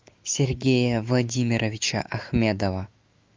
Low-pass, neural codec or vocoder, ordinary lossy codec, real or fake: 7.2 kHz; autoencoder, 48 kHz, 128 numbers a frame, DAC-VAE, trained on Japanese speech; Opus, 32 kbps; fake